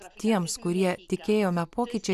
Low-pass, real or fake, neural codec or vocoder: 14.4 kHz; real; none